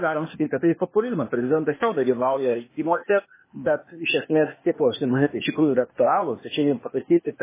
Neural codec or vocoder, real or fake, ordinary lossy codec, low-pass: codec, 16 kHz, 0.8 kbps, ZipCodec; fake; MP3, 16 kbps; 3.6 kHz